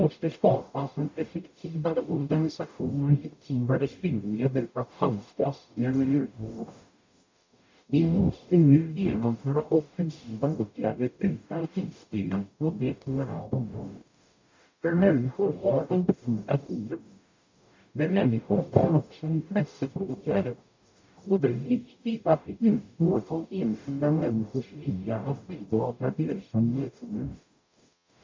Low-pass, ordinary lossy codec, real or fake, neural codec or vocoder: 7.2 kHz; MP3, 64 kbps; fake; codec, 44.1 kHz, 0.9 kbps, DAC